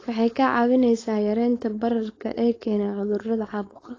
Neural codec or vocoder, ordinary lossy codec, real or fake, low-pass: codec, 16 kHz, 4.8 kbps, FACodec; AAC, 32 kbps; fake; 7.2 kHz